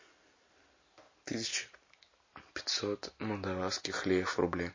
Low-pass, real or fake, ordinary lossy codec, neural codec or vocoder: 7.2 kHz; real; MP3, 32 kbps; none